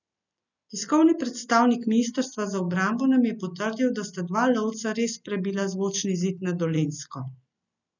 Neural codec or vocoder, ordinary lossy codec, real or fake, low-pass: none; none; real; 7.2 kHz